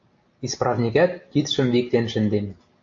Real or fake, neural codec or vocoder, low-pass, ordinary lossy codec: real; none; 7.2 kHz; AAC, 48 kbps